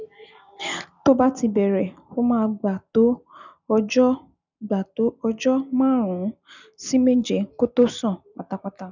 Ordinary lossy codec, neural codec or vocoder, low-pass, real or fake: none; none; 7.2 kHz; real